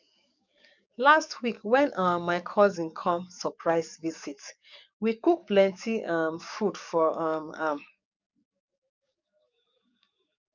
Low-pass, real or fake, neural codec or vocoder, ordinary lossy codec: 7.2 kHz; fake; codec, 44.1 kHz, 7.8 kbps, DAC; none